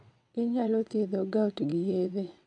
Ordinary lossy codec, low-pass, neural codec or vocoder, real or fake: none; 9.9 kHz; vocoder, 22.05 kHz, 80 mel bands, WaveNeXt; fake